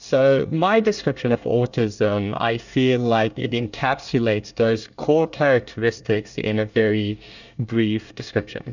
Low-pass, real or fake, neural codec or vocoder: 7.2 kHz; fake; codec, 24 kHz, 1 kbps, SNAC